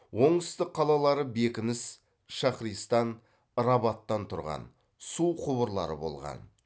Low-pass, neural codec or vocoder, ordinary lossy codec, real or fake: none; none; none; real